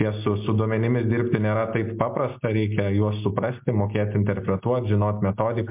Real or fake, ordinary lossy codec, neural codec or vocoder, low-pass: real; MP3, 32 kbps; none; 3.6 kHz